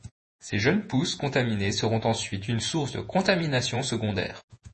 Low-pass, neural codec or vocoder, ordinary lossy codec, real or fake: 10.8 kHz; vocoder, 48 kHz, 128 mel bands, Vocos; MP3, 32 kbps; fake